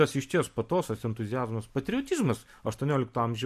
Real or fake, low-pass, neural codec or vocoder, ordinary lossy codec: real; 14.4 kHz; none; MP3, 64 kbps